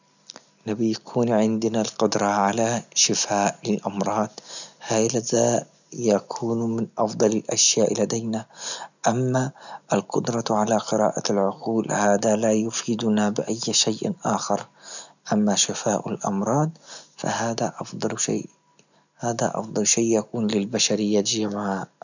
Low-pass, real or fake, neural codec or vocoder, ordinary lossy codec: 7.2 kHz; real; none; none